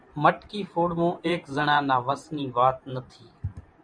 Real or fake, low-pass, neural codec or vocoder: fake; 9.9 kHz; vocoder, 44.1 kHz, 128 mel bands every 512 samples, BigVGAN v2